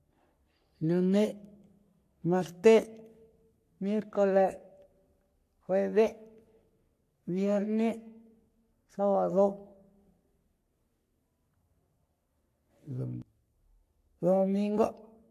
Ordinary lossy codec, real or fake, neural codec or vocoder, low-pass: AAC, 64 kbps; fake; codec, 44.1 kHz, 3.4 kbps, Pupu-Codec; 14.4 kHz